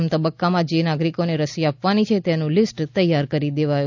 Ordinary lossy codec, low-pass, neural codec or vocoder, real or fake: none; 7.2 kHz; none; real